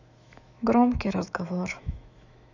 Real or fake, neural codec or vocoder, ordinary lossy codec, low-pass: fake; autoencoder, 48 kHz, 128 numbers a frame, DAC-VAE, trained on Japanese speech; none; 7.2 kHz